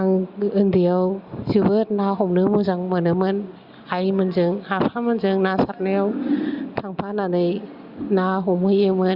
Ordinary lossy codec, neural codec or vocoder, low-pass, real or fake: Opus, 64 kbps; none; 5.4 kHz; real